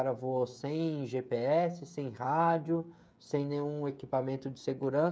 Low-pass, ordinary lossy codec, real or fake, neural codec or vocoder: none; none; fake; codec, 16 kHz, 16 kbps, FreqCodec, smaller model